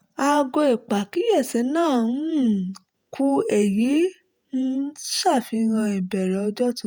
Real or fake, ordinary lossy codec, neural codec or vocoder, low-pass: fake; none; vocoder, 48 kHz, 128 mel bands, Vocos; none